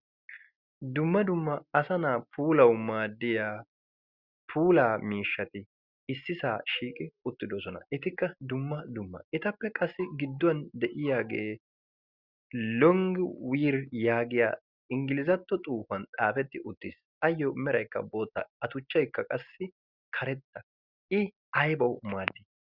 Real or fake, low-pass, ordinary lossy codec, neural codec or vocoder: real; 5.4 kHz; Opus, 64 kbps; none